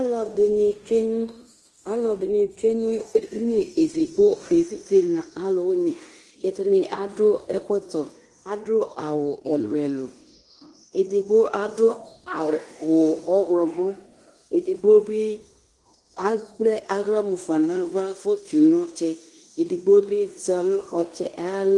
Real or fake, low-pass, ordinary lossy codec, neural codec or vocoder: fake; 10.8 kHz; Opus, 24 kbps; codec, 16 kHz in and 24 kHz out, 0.9 kbps, LongCat-Audio-Codec, fine tuned four codebook decoder